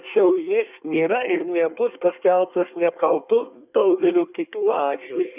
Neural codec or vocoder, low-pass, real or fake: codec, 24 kHz, 1 kbps, SNAC; 3.6 kHz; fake